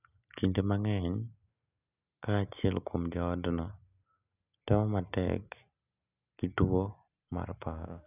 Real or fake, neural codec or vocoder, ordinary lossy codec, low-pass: real; none; none; 3.6 kHz